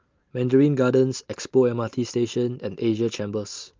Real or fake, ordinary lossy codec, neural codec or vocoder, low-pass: real; Opus, 24 kbps; none; 7.2 kHz